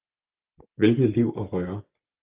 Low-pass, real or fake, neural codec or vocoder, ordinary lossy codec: 3.6 kHz; real; none; Opus, 32 kbps